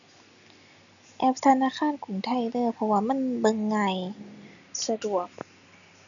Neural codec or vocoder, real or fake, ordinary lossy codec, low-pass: none; real; none; 7.2 kHz